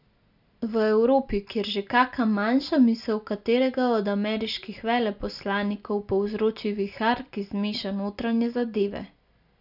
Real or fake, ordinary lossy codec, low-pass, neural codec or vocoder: real; none; 5.4 kHz; none